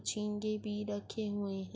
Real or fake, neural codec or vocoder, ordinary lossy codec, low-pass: real; none; none; none